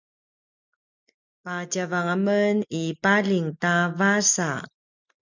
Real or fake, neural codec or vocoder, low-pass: real; none; 7.2 kHz